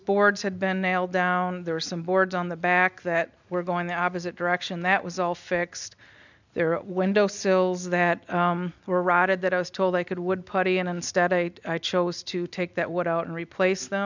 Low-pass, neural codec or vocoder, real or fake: 7.2 kHz; none; real